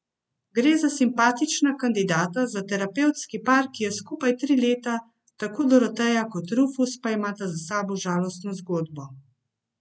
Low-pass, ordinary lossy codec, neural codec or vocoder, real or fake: none; none; none; real